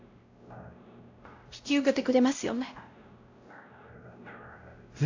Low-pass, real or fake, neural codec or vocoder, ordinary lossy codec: 7.2 kHz; fake; codec, 16 kHz, 0.5 kbps, X-Codec, WavLM features, trained on Multilingual LibriSpeech; AAC, 48 kbps